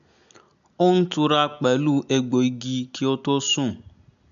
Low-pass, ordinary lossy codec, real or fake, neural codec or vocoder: 7.2 kHz; none; real; none